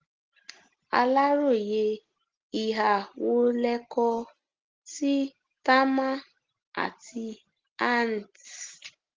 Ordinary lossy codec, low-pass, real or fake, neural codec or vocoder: Opus, 16 kbps; 7.2 kHz; real; none